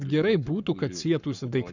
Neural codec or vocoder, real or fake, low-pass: codec, 44.1 kHz, 7.8 kbps, Pupu-Codec; fake; 7.2 kHz